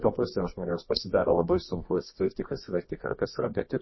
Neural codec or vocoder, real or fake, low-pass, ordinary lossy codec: codec, 24 kHz, 0.9 kbps, WavTokenizer, medium music audio release; fake; 7.2 kHz; MP3, 24 kbps